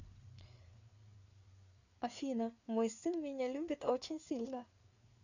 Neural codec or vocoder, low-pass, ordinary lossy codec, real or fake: codec, 16 kHz, 4 kbps, FreqCodec, larger model; 7.2 kHz; none; fake